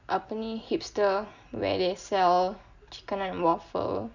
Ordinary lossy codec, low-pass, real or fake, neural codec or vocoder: none; 7.2 kHz; real; none